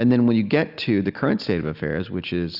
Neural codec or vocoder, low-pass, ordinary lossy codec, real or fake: none; 5.4 kHz; Opus, 64 kbps; real